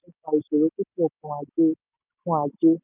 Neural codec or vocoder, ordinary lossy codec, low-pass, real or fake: none; none; 3.6 kHz; real